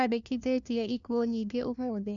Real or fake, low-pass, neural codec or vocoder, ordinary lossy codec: fake; 7.2 kHz; codec, 16 kHz, 1 kbps, FunCodec, trained on LibriTTS, 50 frames a second; none